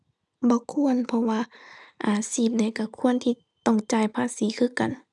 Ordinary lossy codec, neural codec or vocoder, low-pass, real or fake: none; none; 10.8 kHz; real